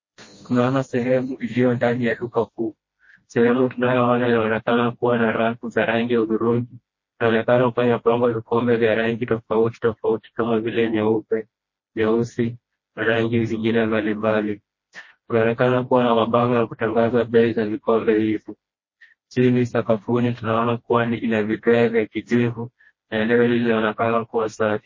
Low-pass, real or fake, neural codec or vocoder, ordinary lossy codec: 7.2 kHz; fake; codec, 16 kHz, 1 kbps, FreqCodec, smaller model; MP3, 32 kbps